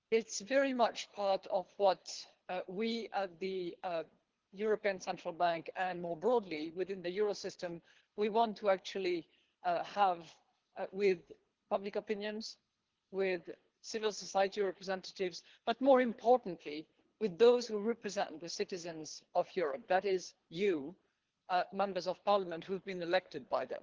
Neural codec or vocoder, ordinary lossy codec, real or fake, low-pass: codec, 24 kHz, 3 kbps, HILCodec; Opus, 16 kbps; fake; 7.2 kHz